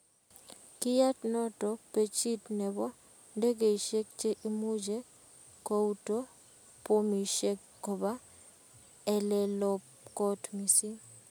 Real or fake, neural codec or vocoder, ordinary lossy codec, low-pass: real; none; none; none